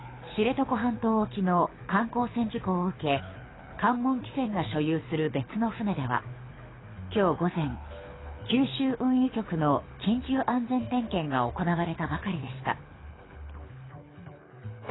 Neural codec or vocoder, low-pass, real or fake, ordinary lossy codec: codec, 24 kHz, 6 kbps, HILCodec; 7.2 kHz; fake; AAC, 16 kbps